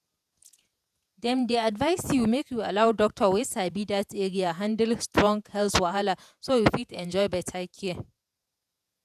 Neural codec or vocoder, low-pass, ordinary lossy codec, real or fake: vocoder, 48 kHz, 128 mel bands, Vocos; 14.4 kHz; none; fake